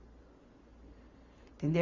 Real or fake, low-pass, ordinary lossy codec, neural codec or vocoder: real; 7.2 kHz; Opus, 64 kbps; none